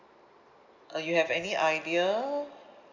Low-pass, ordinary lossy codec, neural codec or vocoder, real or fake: 7.2 kHz; none; none; real